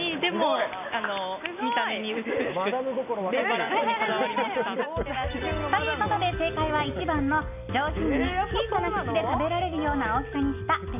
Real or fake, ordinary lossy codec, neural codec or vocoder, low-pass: real; none; none; 3.6 kHz